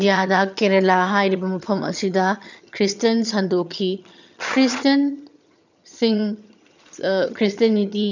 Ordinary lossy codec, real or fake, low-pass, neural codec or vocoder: none; fake; 7.2 kHz; vocoder, 22.05 kHz, 80 mel bands, HiFi-GAN